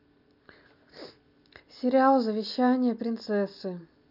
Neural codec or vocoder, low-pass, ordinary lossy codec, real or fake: none; 5.4 kHz; none; real